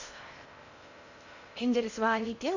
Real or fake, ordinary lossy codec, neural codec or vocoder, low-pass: fake; none; codec, 16 kHz in and 24 kHz out, 0.6 kbps, FocalCodec, streaming, 2048 codes; 7.2 kHz